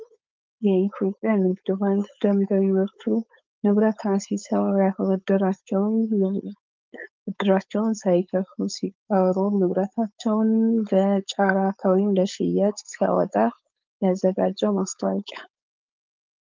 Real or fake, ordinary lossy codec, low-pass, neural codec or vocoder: fake; Opus, 24 kbps; 7.2 kHz; codec, 16 kHz, 4.8 kbps, FACodec